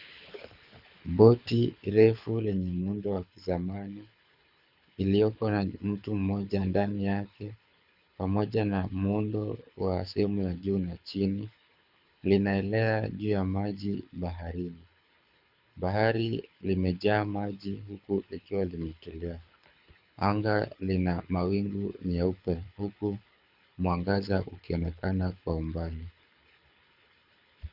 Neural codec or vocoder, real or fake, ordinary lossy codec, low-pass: codec, 24 kHz, 6 kbps, HILCodec; fake; Opus, 64 kbps; 5.4 kHz